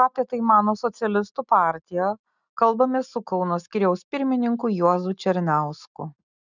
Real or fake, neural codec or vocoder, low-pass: real; none; 7.2 kHz